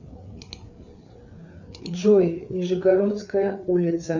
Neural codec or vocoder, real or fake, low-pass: codec, 16 kHz, 4 kbps, FreqCodec, larger model; fake; 7.2 kHz